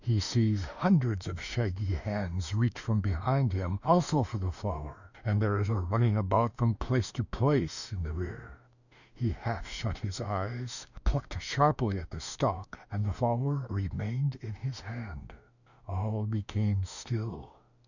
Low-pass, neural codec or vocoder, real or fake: 7.2 kHz; autoencoder, 48 kHz, 32 numbers a frame, DAC-VAE, trained on Japanese speech; fake